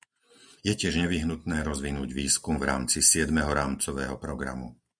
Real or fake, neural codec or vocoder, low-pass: real; none; 9.9 kHz